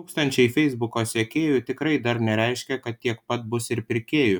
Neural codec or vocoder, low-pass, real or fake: none; 19.8 kHz; real